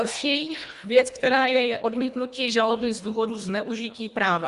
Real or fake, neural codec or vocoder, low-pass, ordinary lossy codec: fake; codec, 24 kHz, 1.5 kbps, HILCodec; 10.8 kHz; Opus, 64 kbps